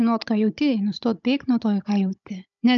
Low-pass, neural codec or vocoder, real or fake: 7.2 kHz; codec, 16 kHz, 16 kbps, FunCodec, trained on Chinese and English, 50 frames a second; fake